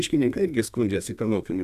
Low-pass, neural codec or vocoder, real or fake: 14.4 kHz; codec, 32 kHz, 1.9 kbps, SNAC; fake